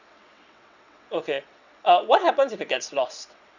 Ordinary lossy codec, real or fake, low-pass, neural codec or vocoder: none; fake; 7.2 kHz; vocoder, 22.05 kHz, 80 mel bands, WaveNeXt